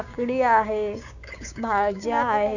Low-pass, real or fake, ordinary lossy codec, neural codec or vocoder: 7.2 kHz; fake; none; codec, 16 kHz, 6 kbps, DAC